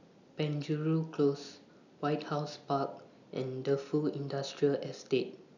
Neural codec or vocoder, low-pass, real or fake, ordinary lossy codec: none; 7.2 kHz; real; none